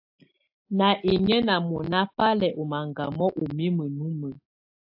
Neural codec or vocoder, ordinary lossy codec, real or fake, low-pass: none; AAC, 48 kbps; real; 5.4 kHz